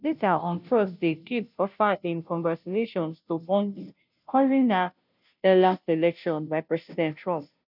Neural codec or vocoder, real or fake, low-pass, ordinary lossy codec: codec, 16 kHz, 0.5 kbps, FunCodec, trained on Chinese and English, 25 frames a second; fake; 5.4 kHz; none